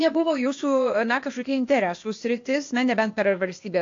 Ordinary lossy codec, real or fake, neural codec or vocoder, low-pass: AAC, 48 kbps; fake; codec, 16 kHz, 0.8 kbps, ZipCodec; 7.2 kHz